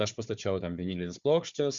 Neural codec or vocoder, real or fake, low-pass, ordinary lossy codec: codec, 16 kHz, 4 kbps, FunCodec, trained on Chinese and English, 50 frames a second; fake; 7.2 kHz; Opus, 64 kbps